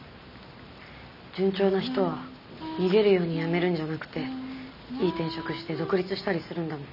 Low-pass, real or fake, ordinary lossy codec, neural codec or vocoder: 5.4 kHz; real; none; none